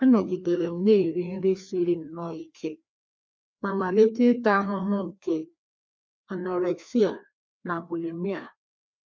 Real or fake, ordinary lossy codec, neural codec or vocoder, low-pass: fake; none; codec, 16 kHz, 2 kbps, FreqCodec, larger model; none